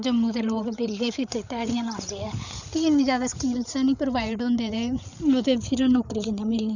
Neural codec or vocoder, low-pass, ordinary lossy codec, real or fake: codec, 16 kHz, 8 kbps, FreqCodec, larger model; 7.2 kHz; none; fake